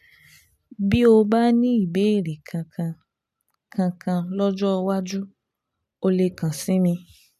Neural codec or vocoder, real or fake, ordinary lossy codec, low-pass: none; real; none; 14.4 kHz